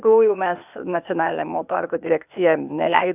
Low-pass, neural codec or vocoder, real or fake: 3.6 kHz; codec, 16 kHz, 0.8 kbps, ZipCodec; fake